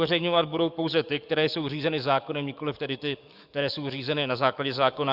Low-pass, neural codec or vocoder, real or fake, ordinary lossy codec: 5.4 kHz; codec, 44.1 kHz, 7.8 kbps, DAC; fake; Opus, 64 kbps